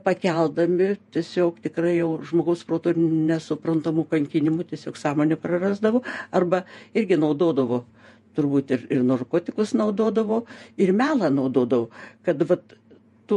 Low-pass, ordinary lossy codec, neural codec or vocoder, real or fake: 14.4 kHz; MP3, 48 kbps; vocoder, 48 kHz, 128 mel bands, Vocos; fake